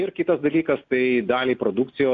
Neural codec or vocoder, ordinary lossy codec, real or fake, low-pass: none; AAC, 64 kbps; real; 7.2 kHz